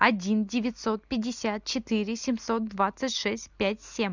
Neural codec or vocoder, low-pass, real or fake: none; 7.2 kHz; real